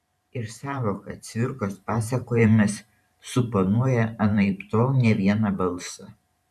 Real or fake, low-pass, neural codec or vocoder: fake; 14.4 kHz; vocoder, 44.1 kHz, 128 mel bands every 512 samples, BigVGAN v2